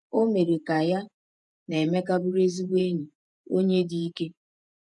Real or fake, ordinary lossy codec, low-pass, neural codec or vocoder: fake; none; 10.8 kHz; vocoder, 48 kHz, 128 mel bands, Vocos